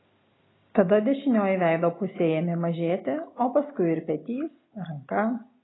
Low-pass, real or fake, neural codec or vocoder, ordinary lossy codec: 7.2 kHz; real; none; AAC, 16 kbps